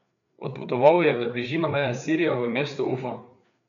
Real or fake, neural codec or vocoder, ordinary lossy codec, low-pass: fake; codec, 16 kHz, 4 kbps, FreqCodec, larger model; none; 7.2 kHz